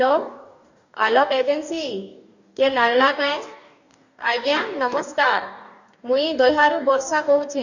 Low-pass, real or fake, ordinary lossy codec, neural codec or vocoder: 7.2 kHz; fake; none; codec, 44.1 kHz, 2.6 kbps, DAC